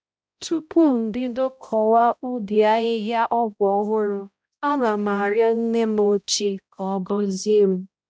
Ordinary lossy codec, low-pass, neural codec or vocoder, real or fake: none; none; codec, 16 kHz, 0.5 kbps, X-Codec, HuBERT features, trained on balanced general audio; fake